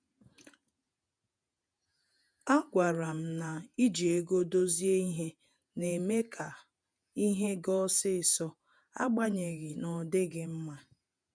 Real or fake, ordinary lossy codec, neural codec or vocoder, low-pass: fake; none; vocoder, 48 kHz, 128 mel bands, Vocos; 9.9 kHz